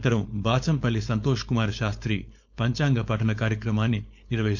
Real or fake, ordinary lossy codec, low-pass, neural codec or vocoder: fake; none; 7.2 kHz; codec, 16 kHz, 4.8 kbps, FACodec